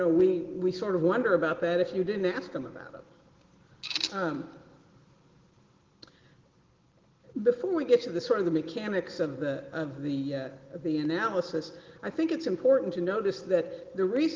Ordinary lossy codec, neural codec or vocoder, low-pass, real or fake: Opus, 16 kbps; none; 7.2 kHz; real